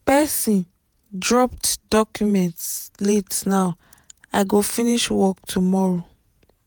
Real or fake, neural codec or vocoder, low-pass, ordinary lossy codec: fake; vocoder, 48 kHz, 128 mel bands, Vocos; none; none